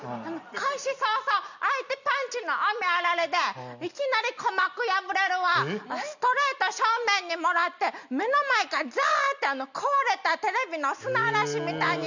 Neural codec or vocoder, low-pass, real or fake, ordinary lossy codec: none; 7.2 kHz; real; none